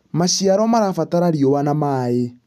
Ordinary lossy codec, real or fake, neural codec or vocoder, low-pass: none; real; none; 14.4 kHz